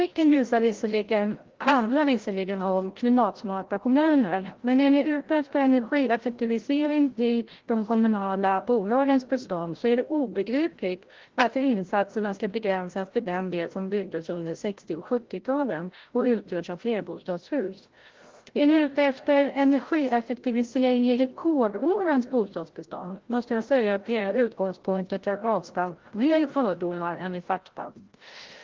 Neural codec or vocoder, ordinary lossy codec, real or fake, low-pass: codec, 16 kHz, 0.5 kbps, FreqCodec, larger model; Opus, 16 kbps; fake; 7.2 kHz